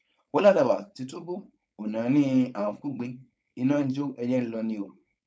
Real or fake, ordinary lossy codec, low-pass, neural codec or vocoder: fake; none; none; codec, 16 kHz, 4.8 kbps, FACodec